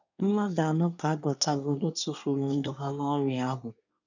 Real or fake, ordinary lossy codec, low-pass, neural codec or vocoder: fake; none; 7.2 kHz; codec, 24 kHz, 1 kbps, SNAC